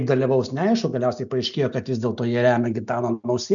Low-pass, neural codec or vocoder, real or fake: 7.2 kHz; none; real